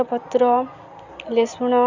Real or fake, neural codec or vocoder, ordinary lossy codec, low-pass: real; none; none; 7.2 kHz